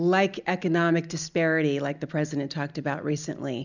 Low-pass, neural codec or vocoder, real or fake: 7.2 kHz; none; real